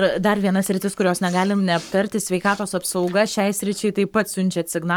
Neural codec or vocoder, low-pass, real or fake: codec, 44.1 kHz, 7.8 kbps, Pupu-Codec; 19.8 kHz; fake